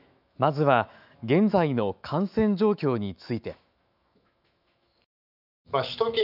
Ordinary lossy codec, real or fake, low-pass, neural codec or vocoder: none; fake; 5.4 kHz; autoencoder, 48 kHz, 128 numbers a frame, DAC-VAE, trained on Japanese speech